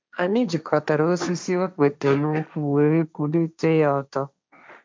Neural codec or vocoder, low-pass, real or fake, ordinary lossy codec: codec, 16 kHz, 1.1 kbps, Voila-Tokenizer; none; fake; none